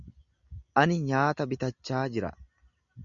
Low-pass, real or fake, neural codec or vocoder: 7.2 kHz; real; none